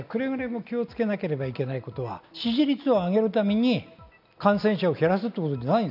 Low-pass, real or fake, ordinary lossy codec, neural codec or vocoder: 5.4 kHz; real; none; none